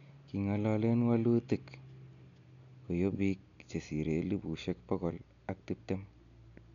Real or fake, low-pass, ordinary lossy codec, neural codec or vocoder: real; 7.2 kHz; none; none